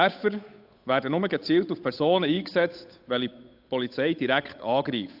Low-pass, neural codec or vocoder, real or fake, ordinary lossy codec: 5.4 kHz; codec, 16 kHz, 8 kbps, FunCodec, trained on Chinese and English, 25 frames a second; fake; AAC, 48 kbps